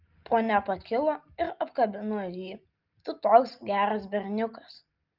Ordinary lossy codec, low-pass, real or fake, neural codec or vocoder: Opus, 24 kbps; 5.4 kHz; real; none